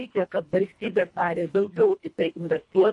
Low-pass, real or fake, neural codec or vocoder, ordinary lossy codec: 10.8 kHz; fake; codec, 24 kHz, 1.5 kbps, HILCodec; MP3, 48 kbps